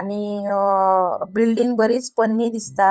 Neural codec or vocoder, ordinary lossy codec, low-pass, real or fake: codec, 16 kHz, 8 kbps, FunCodec, trained on LibriTTS, 25 frames a second; none; none; fake